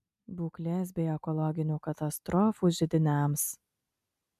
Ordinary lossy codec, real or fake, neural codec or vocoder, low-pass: MP3, 96 kbps; real; none; 14.4 kHz